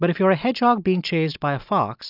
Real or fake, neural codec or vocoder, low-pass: real; none; 5.4 kHz